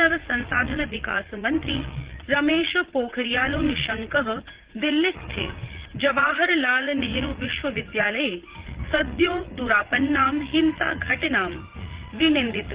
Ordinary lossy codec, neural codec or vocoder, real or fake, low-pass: Opus, 16 kbps; vocoder, 44.1 kHz, 80 mel bands, Vocos; fake; 3.6 kHz